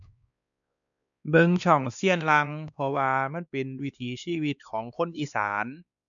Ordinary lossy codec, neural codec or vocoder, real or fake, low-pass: none; codec, 16 kHz, 2 kbps, X-Codec, WavLM features, trained on Multilingual LibriSpeech; fake; 7.2 kHz